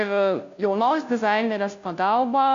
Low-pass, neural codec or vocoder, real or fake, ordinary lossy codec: 7.2 kHz; codec, 16 kHz, 0.5 kbps, FunCodec, trained on Chinese and English, 25 frames a second; fake; AAC, 64 kbps